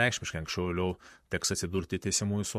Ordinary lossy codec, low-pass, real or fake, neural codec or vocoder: MP3, 64 kbps; 14.4 kHz; fake; vocoder, 44.1 kHz, 128 mel bands, Pupu-Vocoder